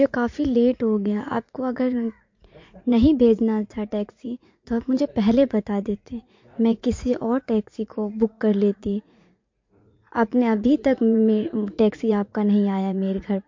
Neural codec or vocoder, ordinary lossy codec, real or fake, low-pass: vocoder, 44.1 kHz, 80 mel bands, Vocos; MP3, 48 kbps; fake; 7.2 kHz